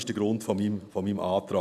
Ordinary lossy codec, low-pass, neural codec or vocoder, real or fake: none; 14.4 kHz; none; real